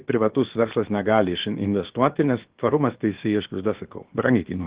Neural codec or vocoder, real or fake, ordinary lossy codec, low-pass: codec, 16 kHz, about 1 kbps, DyCAST, with the encoder's durations; fake; Opus, 16 kbps; 3.6 kHz